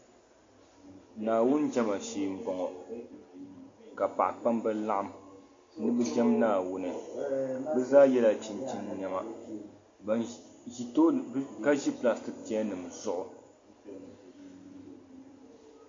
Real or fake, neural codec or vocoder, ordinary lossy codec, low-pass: real; none; MP3, 48 kbps; 7.2 kHz